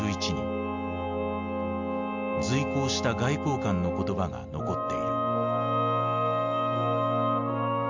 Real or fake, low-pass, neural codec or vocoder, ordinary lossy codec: real; 7.2 kHz; none; MP3, 64 kbps